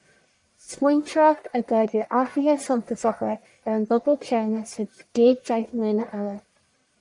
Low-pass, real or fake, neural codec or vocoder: 10.8 kHz; fake; codec, 44.1 kHz, 1.7 kbps, Pupu-Codec